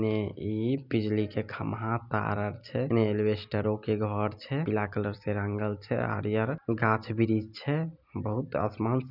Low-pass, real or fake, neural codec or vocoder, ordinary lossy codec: 5.4 kHz; real; none; none